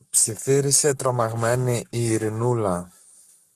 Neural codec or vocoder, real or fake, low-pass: codec, 44.1 kHz, 7.8 kbps, Pupu-Codec; fake; 14.4 kHz